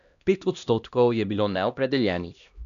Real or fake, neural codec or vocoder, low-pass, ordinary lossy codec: fake; codec, 16 kHz, 1 kbps, X-Codec, HuBERT features, trained on LibriSpeech; 7.2 kHz; none